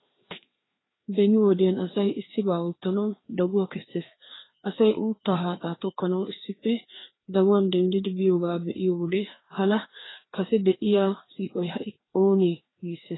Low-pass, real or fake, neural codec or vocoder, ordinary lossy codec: 7.2 kHz; fake; codec, 16 kHz, 2 kbps, FreqCodec, larger model; AAC, 16 kbps